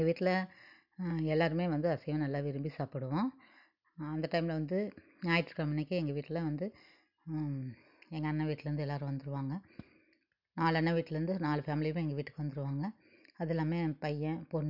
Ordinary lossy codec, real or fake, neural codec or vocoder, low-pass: none; real; none; 5.4 kHz